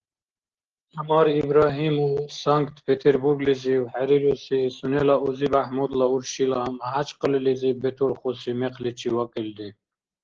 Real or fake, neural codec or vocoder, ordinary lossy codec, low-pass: real; none; Opus, 16 kbps; 7.2 kHz